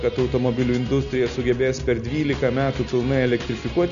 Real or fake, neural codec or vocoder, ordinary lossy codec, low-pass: real; none; MP3, 96 kbps; 7.2 kHz